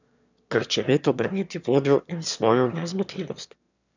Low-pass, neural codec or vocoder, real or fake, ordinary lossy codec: 7.2 kHz; autoencoder, 22.05 kHz, a latent of 192 numbers a frame, VITS, trained on one speaker; fake; none